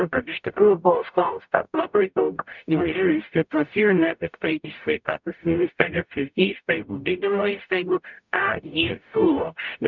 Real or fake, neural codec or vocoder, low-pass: fake; codec, 44.1 kHz, 0.9 kbps, DAC; 7.2 kHz